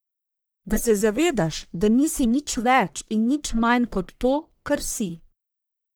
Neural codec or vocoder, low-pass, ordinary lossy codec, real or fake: codec, 44.1 kHz, 1.7 kbps, Pupu-Codec; none; none; fake